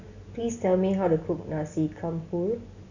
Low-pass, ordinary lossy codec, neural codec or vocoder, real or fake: 7.2 kHz; none; none; real